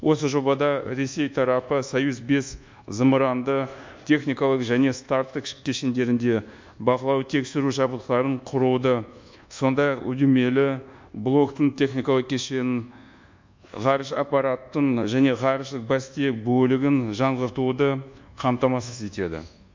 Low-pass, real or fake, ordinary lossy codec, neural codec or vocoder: 7.2 kHz; fake; MP3, 64 kbps; codec, 24 kHz, 1.2 kbps, DualCodec